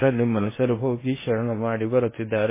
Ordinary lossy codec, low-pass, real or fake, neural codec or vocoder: MP3, 16 kbps; 3.6 kHz; fake; codec, 16 kHz in and 24 kHz out, 0.8 kbps, FocalCodec, streaming, 65536 codes